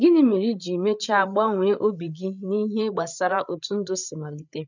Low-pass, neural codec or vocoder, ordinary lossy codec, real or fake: 7.2 kHz; codec, 16 kHz, 8 kbps, FreqCodec, larger model; none; fake